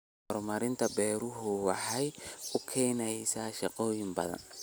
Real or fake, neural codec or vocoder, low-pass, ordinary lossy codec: real; none; none; none